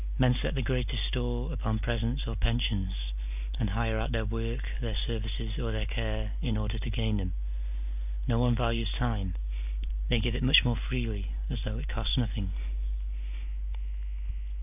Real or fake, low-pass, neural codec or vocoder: real; 3.6 kHz; none